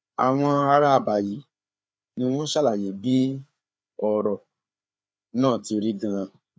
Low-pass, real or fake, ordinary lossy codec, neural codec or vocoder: none; fake; none; codec, 16 kHz, 4 kbps, FreqCodec, larger model